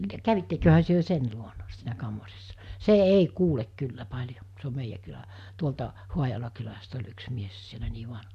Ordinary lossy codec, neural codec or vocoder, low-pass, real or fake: none; none; 14.4 kHz; real